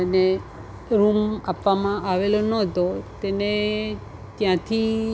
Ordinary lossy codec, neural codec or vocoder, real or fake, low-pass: none; none; real; none